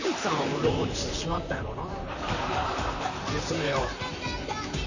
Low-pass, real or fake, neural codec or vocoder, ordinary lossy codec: 7.2 kHz; fake; vocoder, 44.1 kHz, 128 mel bands, Pupu-Vocoder; none